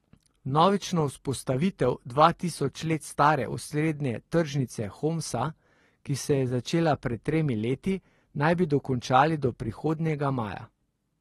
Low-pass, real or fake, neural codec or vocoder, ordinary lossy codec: 19.8 kHz; real; none; AAC, 32 kbps